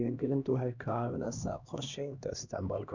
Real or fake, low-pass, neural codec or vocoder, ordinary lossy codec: fake; 7.2 kHz; codec, 16 kHz, 1 kbps, X-Codec, HuBERT features, trained on LibriSpeech; none